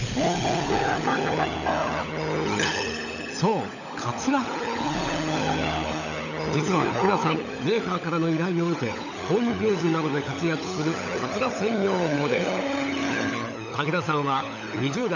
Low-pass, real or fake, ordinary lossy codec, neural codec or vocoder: 7.2 kHz; fake; none; codec, 16 kHz, 16 kbps, FunCodec, trained on LibriTTS, 50 frames a second